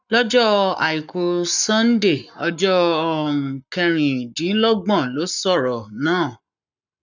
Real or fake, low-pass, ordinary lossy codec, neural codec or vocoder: fake; 7.2 kHz; none; codec, 44.1 kHz, 7.8 kbps, Pupu-Codec